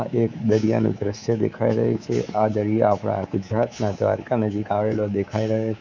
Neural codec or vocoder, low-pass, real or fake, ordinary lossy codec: codec, 24 kHz, 3.1 kbps, DualCodec; 7.2 kHz; fake; none